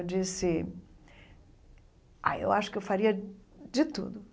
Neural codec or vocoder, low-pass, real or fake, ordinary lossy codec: none; none; real; none